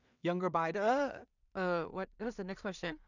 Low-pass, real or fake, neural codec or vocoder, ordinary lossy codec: 7.2 kHz; fake; codec, 16 kHz in and 24 kHz out, 0.4 kbps, LongCat-Audio-Codec, two codebook decoder; none